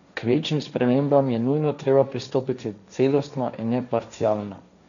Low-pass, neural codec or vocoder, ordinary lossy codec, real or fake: 7.2 kHz; codec, 16 kHz, 1.1 kbps, Voila-Tokenizer; none; fake